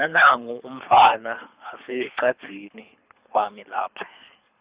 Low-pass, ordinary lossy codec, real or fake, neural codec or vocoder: 3.6 kHz; Opus, 64 kbps; fake; codec, 24 kHz, 3 kbps, HILCodec